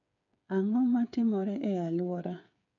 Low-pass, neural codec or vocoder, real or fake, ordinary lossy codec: 7.2 kHz; codec, 16 kHz, 8 kbps, FreqCodec, smaller model; fake; none